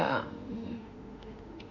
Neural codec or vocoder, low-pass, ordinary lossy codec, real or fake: autoencoder, 48 kHz, 32 numbers a frame, DAC-VAE, trained on Japanese speech; 7.2 kHz; none; fake